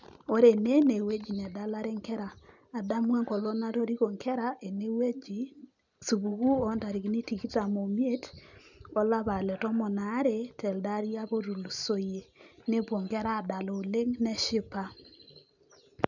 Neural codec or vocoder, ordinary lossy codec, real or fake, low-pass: none; none; real; 7.2 kHz